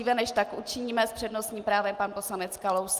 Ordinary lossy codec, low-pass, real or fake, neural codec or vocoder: Opus, 32 kbps; 14.4 kHz; real; none